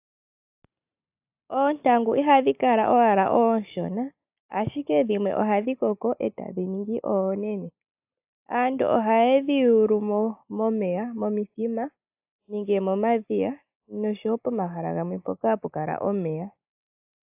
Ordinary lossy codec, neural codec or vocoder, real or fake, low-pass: AAC, 32 kbps; none; real; 3.6 kHz